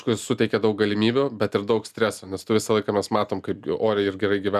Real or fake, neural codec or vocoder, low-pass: real; none; 14.4 kHz